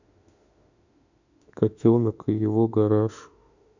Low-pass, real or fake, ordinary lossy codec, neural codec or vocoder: 7.2 kHz; fake; none; autoencoder, 48 kHz, 32 numbers a frame, DAC-VAE, trained on Japanese speech